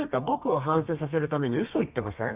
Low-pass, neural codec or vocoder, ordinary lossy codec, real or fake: 3.6 kHz; codec, 32 kHz, 1.9 kbps, SNAC; Opus, 64 kbps; fake